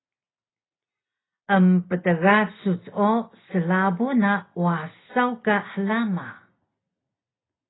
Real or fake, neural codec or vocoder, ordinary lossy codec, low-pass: real; none; AAC, 16 kbps; 7.2 kHz